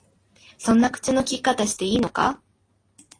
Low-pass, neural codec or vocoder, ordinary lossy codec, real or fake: 9.9 kHz; none; AAC, 32 kbps; real